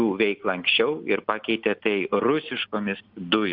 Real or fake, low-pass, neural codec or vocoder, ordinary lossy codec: real; 5.4 kHz; none; Opus, 64 kbps